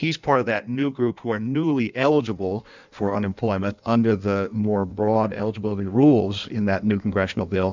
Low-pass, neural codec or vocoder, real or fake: 7.2 kHz; codec, 16 kHz in and 24 kHz out, 1.1 kbps, FireRedTTS-2 codec; fake